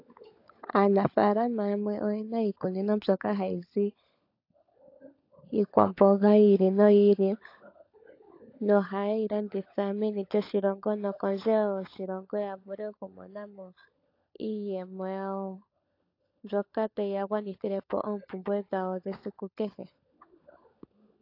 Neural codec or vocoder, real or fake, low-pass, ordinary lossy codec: codec, 16 kHz, 16 kbps, FunCodec, trained on LibriTTS, 50 frames a second; fake; 5.4 kHz; AAC, 32 kbps